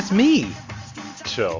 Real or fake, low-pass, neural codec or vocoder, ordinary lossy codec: real; 7.2 kHz; none; MP3, 64 kbps